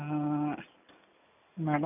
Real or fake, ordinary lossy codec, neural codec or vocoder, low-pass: real; none; none; 3.6 kHz